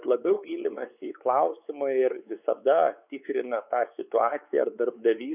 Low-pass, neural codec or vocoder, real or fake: 3.6 kHz; codec, 16 kHz, 4 kbps, X-Codec, WavLM features, trained on Multilingual LibriSpeech; fake